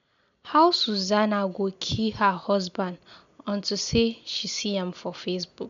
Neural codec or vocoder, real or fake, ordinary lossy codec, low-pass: none; real; MP3, 64 kbps; 7.2 kHz